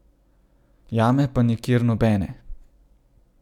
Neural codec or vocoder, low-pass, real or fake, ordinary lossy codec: none; 19.8 kHz; real; none